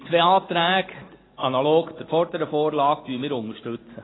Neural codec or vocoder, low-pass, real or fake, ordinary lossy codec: none; 7.2 kHz; real; AAC, 16 kbps